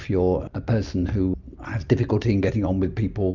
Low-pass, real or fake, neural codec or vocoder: 7.2 kHz; real; none